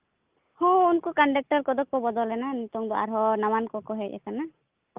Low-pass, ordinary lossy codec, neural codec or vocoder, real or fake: 3.6 kHz; Opus, 24 kbps; none; real